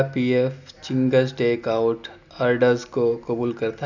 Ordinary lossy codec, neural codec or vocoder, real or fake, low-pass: none; none; real; 7.2 kHz